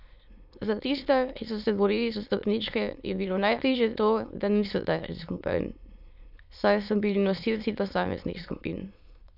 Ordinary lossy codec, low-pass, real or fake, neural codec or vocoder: none; 5.4 kHz; fake; autoencoder, 22.05 kHz, a latent of 192 numbers a frame, VITS, trained on many speakers